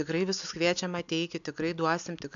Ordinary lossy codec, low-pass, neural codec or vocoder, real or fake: MP3, 96 kbps; 7.2 kHz; none; real